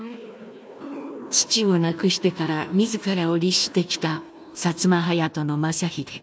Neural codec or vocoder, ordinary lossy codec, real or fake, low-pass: codec, 16 kHz, 1 kbps, FunCodec, trained on Chinese and English, 50 frames a second; none; fake; none